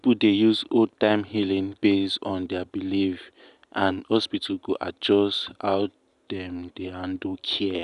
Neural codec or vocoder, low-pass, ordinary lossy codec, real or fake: none; 10.8 kHz; none; real